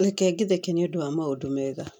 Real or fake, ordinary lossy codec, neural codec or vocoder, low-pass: real; none; none; 19.8 kHz